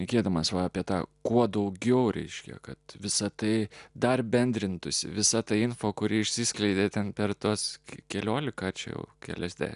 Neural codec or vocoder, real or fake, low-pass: none; real; 10.8 kHz